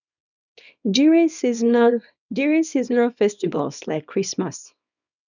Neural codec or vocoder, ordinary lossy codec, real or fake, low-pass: codec, 24 kHz, 0.9 kbps, WavTokenizer, small release; none; fake; 7.2 kHz